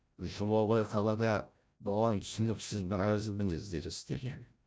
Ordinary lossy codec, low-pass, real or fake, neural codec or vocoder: none; none; fake; codec, 16 kHz, 0.5 kbps, FreqCodec, larger model